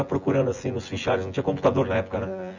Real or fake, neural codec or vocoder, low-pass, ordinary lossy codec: fake; vocoder, 24 kHz, 100 mel bands, Vocos; 7.2 kHz; none